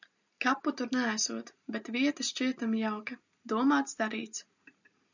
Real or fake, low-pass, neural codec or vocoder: real; 7.2 kHz; none